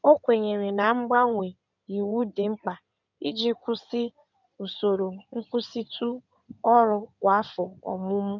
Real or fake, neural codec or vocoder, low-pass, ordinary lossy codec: fake; codec, 16 kHz in and 24 kHz out, 2.2 kbps, FireRedTTS-2 codec; 7.2 kHz; none